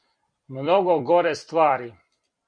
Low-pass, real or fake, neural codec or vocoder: 9.9 kHz; fake; vocoder, 44.1 kHz, 128 mel bands every 256 samples, BigVGAN v2